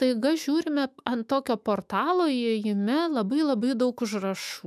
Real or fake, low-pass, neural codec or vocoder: fake; 14.4 kHz; autoencoder, 48 kHz, 128 numbers a frame, DAC-VAE, trained on Japanese speech